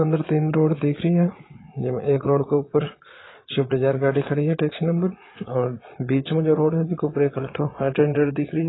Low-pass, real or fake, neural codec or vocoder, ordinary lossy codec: 7.2 kHz; fake; vocoder, 22.05 kHz, 80 mel bands, Vocos; AAC, 16 kbps